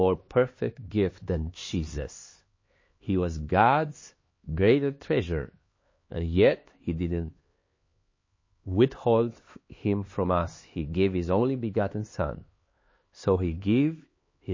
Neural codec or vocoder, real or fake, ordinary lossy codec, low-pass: codec, 16 kHz, 2 kbps, X-Codec, HuBERT features, trained on LibriSpeech; fake; MP3, 32 kbps; 7.2 kHz